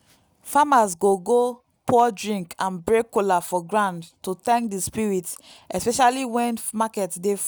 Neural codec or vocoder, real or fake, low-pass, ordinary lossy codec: none; real; none; none